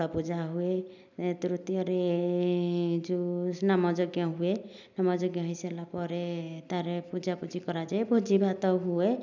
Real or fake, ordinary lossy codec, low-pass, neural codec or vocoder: real; none; 7.2 kHz; none